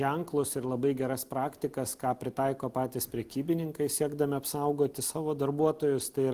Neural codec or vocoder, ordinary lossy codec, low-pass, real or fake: none; Opus, 24 kbps; 14.4 kHz; real